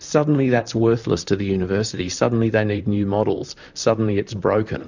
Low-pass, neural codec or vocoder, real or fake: 7.2 kHz; vocoder, 44.1 kHz, 128 mel bands, Pupu-Vocoder; fake